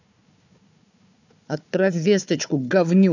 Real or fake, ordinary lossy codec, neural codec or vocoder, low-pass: fake; none; codec, 16 kHz, 4 kbps, FunCodec, trained on Chinese and English, 50 frames a second; 7.2 kHz